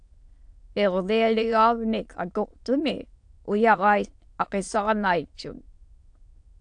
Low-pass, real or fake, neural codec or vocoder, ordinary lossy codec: 9.9 kHz; fake; autoencoder, 22.05 kHz, a latent of 192 numbers a frame, VITS, trained on many speakers; AAC, 64 kbps